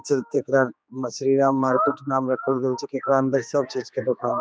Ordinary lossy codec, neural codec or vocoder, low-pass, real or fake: none; codec, 16 kHz, 2 kbps, X-Codec, HuBERT features, trained on general audio; none; fake